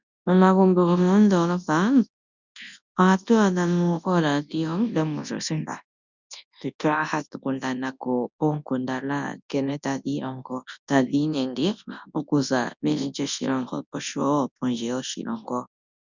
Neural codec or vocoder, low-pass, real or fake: codec, 24 kHz, 0.9 kbps, WavTokenizer, large speech release; 7.2 kHz; fake